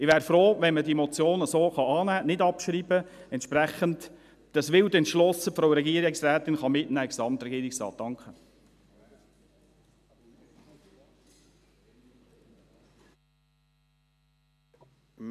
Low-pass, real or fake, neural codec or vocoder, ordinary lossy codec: 14.4 kHz; real; none; none